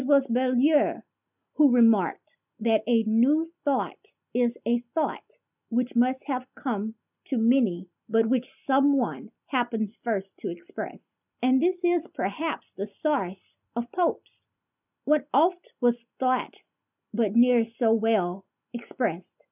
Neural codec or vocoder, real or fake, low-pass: none; real; 3.6 kHz